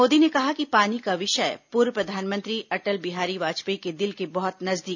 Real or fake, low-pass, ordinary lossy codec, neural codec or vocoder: real; 7.2 kHz; none; none